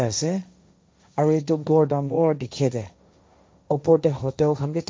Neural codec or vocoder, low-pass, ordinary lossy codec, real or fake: codec, 16 kHz, 1.1 kbps, Voila-Tokenizer; none; none; fake